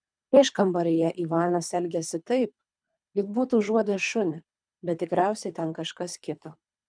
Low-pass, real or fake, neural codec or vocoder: 9.9 kHz; fake; codec, 24 kHz, 3 kbps, HILCodec